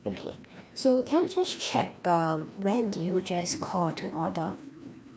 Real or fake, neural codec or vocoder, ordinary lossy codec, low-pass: fake; codec, 16 kHz, 1 kbps, FreqCodec, larger model; none; none